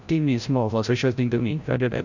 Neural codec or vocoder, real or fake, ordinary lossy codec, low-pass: codec, 16 kHz, 0.5 kbps, FreqCodec, larger model; fake; none; 7.2 kHz